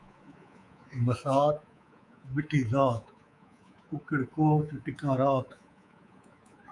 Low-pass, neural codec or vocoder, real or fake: 10.8 kHz; codec, 24 kHz, 3.1 kbps, DualCodec; fake